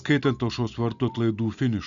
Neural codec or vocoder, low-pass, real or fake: none; 7.2 kHz; real